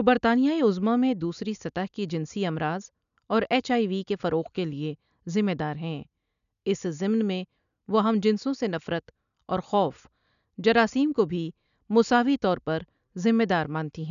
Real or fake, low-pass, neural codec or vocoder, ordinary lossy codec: real; 7.2 kHz; none; none